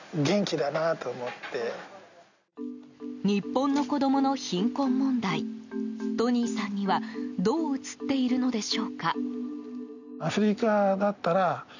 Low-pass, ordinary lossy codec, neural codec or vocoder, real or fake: 7.2 kHz; none; none; real